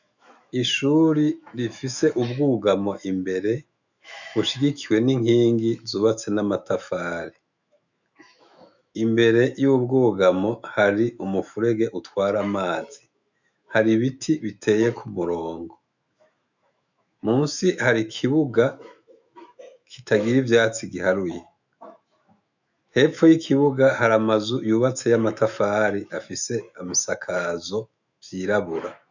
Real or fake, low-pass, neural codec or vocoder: fake; 7.2 kHz; autoencoder, 48 kHz, 128 numbers a frame, DAC-VAE, trained on Japanese speech